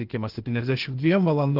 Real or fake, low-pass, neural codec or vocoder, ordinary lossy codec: fake; 5.4 kHz; codec, 16 kHz, 0.8 kbps, ZipCodec; Opus, 16 kbps